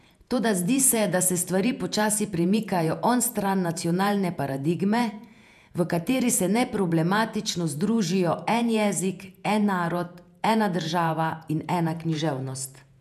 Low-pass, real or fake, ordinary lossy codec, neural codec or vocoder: 14.4 kHz; fake; none; vocoder, 48 kHz, 128 mel bands, Vocos